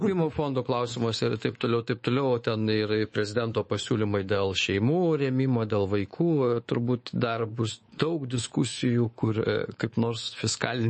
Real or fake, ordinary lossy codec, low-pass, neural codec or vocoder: fake; MP3, 32 kbps; 10.8 kHz; codec, 24 kHz, 3.1 kbps, DualCodec